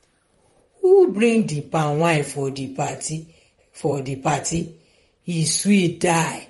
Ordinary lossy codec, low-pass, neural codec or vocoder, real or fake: MP3, 48 kbps; 19.8 kHz; vocoder, 44.1 kHz, 128 mel bands, Pupu-Vocoder; fake